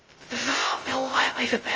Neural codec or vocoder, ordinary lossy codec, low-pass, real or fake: codec, 16 kHz, 0.2 kbps, FocalCodec; Opus, 24 kbps; 7.2 kHz; fake